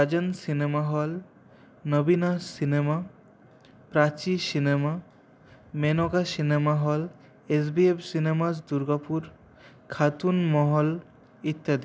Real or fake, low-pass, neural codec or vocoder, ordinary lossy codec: real; none; none; none